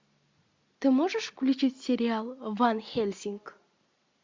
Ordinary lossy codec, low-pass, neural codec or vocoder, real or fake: MP3, 64 kbps; 7.2 kHz; none; real